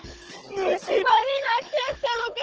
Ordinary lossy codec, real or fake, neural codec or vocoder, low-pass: Opus, 16 kbps; fake; codec, 16 kHz, 16 kbps, FunCodec, trained on Chinese and English, 50 frames a second; 7.2 kHz